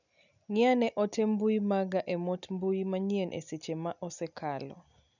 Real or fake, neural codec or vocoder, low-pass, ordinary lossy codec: real; none; 7.2 kHz; none